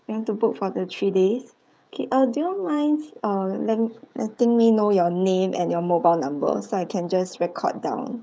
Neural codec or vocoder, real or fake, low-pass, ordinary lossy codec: codec, 16 kHz, 16 kbps, FreqCodec, smaller model; fake; none; none